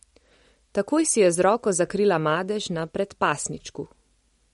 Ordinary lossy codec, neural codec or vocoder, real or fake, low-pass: MP3, 48 kbps; none; real; 19.8 kHz